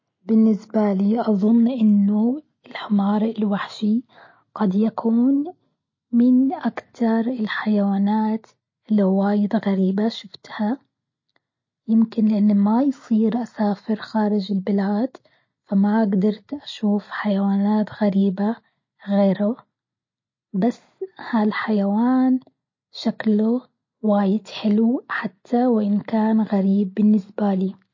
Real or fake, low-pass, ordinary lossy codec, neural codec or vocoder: real; 7.2 kHz; MP3, 32 kbps; none